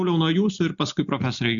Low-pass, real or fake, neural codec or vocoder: 7.2 kHz; real; none